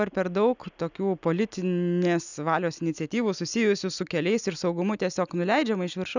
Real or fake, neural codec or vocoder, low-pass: real; none; 7.2 kHz